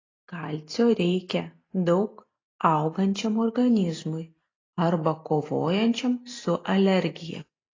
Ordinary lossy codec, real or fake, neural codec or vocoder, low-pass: AAC, 32 kbps; real; none; 7.2 kHz